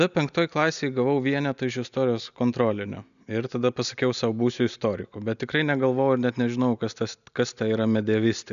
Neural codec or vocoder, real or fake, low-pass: none; real; 7.2 kHz